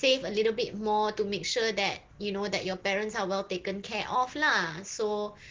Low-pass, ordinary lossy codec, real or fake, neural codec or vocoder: 7.2 kHz; Opus, 16 kbps; real; none